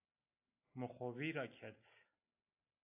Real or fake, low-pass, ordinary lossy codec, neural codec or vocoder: real; 3.6 kHz; AAC, 24 kbps; none